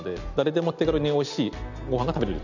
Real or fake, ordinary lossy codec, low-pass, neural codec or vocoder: real; none; 7.2 kHz; none